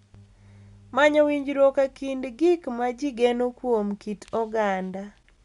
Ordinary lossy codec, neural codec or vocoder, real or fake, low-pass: none; none; real; 10.8 kHz